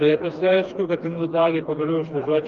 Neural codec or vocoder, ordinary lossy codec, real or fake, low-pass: codec, 16 kHz, 2 kbps, FreqCodec, smaller model; Opus, 32 kbps; fake; 7.2 kHz